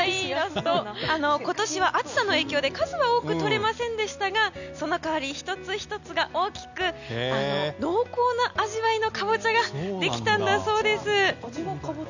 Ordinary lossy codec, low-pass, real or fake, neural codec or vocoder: none; 7.2 kHz; real; none